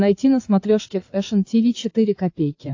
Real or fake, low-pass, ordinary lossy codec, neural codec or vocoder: fake; 7.2 kHz; AAC, 48 kbps; codec, 16 kHz, 8 kbps, FreqCodec, larger model